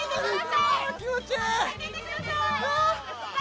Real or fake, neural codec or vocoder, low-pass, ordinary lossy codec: real; none; none; none